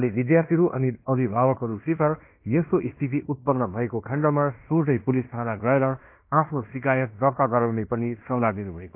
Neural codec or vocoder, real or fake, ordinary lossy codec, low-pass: codec, 16 kHz in and 24 kHz out, 0.9 kbps, LongCat-Audio-Codec, fine tuned four codebook decoder; fake; AAC, 32 kbps; 3.6 kHz